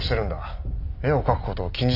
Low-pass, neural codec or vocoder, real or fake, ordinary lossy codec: 5.4 kHz; none; real; none